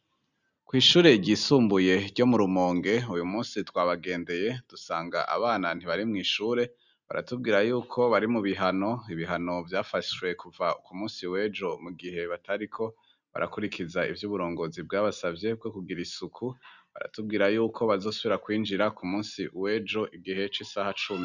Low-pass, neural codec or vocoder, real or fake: 7.2 kHz; none; real